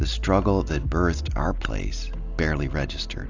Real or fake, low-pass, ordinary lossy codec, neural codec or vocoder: real; 7.2 kHz; AAC, 48 kbps; none